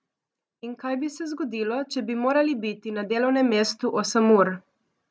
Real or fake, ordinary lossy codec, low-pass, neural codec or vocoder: real; none; none; none